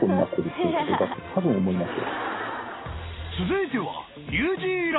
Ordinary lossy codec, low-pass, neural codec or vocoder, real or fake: AAC, 16 kbps; 7.2 kHz; none; real